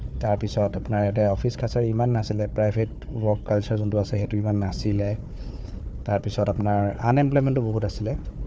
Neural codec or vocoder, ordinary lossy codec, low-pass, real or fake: codec, 16 kHz, 4 kbps, FunCodec, trained on Chinese and English, 50 frames a second; none; none; fake